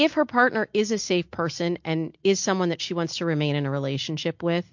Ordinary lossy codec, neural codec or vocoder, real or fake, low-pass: MP3, 48 kbps; none; real; 7.2 kHz